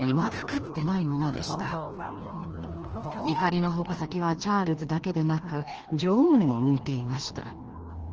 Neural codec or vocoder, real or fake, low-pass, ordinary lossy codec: codec, 16 kHz, 1 kbps, FreqCodec, larger model; fake; 7.2 kHz; Opus, 16 kbps